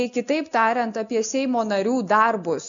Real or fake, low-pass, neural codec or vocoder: real; 7.2 kHz; none